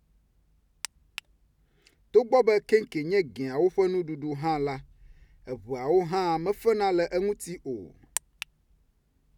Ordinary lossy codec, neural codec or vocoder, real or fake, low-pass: none; none; real; 19.8 kHz